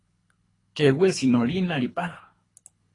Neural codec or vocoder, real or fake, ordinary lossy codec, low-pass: codec, 24 kHz, 3 kbps, HILCodec; fake; AAC, 32 kbps; 10.8 kHz